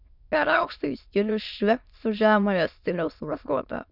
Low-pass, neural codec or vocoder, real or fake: 5.4 kHz; autoencoder, 22.05 kHz, a latent of 192 numbers a frame, VITS, trained on many speakers; fake